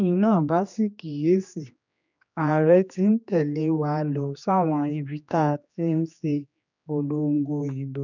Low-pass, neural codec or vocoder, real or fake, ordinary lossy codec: 7.2 kHz; codec, 16 kHz, 2 kbps, X-Codec, HuBERT features, trained on general audio; fake; none